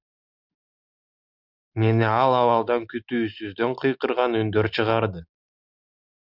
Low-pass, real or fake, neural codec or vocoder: 5.4 kHz; real; none